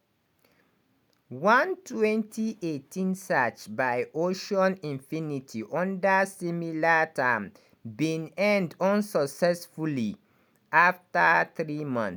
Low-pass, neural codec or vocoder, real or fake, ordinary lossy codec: none; none; real; none